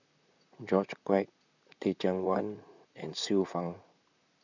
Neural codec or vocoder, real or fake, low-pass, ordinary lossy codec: vocoder, 44.1 kHz, 128 mel bands, Pupu-Vocoder; fake; 7.2 kHz; none